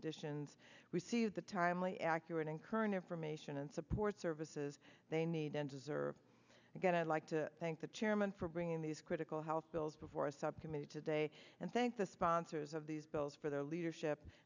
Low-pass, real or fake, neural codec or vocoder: 7.2 kHz; real; none